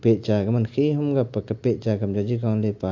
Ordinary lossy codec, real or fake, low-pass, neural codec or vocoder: AAC, 48 kbps; real; 7.2 kHz; none